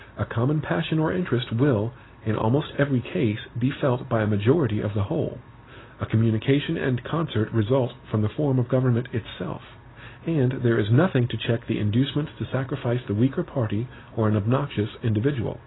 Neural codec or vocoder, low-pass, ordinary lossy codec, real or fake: none; 7.2 kHz; AAC, 16 kbps; real